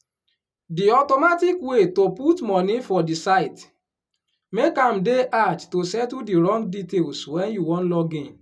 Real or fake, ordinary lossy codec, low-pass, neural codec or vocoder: real; none; none; none